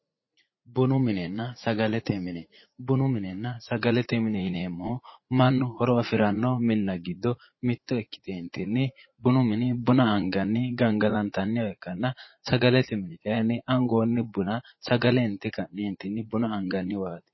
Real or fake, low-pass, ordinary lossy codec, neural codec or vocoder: fake; 7.2 kHz; MP3, 24 kbps; vocoder, 44.1 kHz, 80 mel bands, Vocos